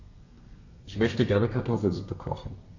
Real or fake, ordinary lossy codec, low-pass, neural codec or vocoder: fake; none; 7.2 kHz; codec, 32 kHz, 1.9 kbps, SNAC